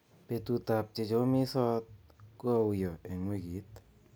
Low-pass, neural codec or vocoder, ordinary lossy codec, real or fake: none; none; none; real